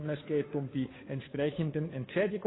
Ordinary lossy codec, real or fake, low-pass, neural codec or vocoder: AAC, 16 kbps; fake; 7.2 kHz; codec, 16 kHz, 2 kbps, FunCodec, trained on Chinese and English, 25 frames a second